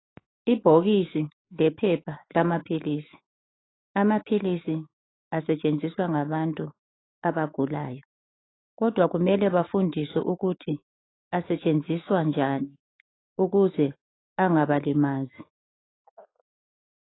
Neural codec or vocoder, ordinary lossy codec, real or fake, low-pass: autoencoder, 48 kHz, 128 numbers a frame, DAC-VAE, trained on Japanese speech; AAC, 16 kbps; fake; 7.2 kHz